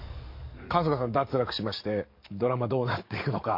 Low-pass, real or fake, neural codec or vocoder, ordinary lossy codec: 5.4 kHz; fake; codec, 44.1 kHz, 7.8 kbps, DAC; MP3, 32 kbps